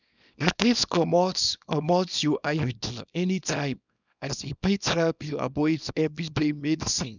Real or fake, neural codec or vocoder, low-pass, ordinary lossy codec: fake; codec, 24 kHz, 0.9 kbps, WavTokenizer, small release; 7.2 kHz; none